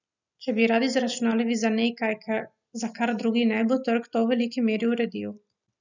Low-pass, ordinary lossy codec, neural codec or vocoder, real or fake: 7.2 kHz; none; none; real